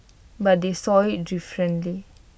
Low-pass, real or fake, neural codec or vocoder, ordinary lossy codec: none; real; none; none